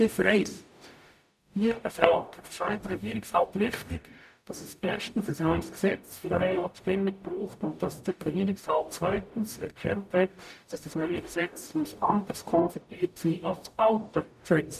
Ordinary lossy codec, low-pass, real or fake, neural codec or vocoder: none; 14.4 kHz; fake; codec, 44.1 kHz, 0.9 kbps, DAC